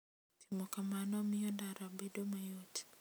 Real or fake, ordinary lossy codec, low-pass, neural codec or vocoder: real; none; none; none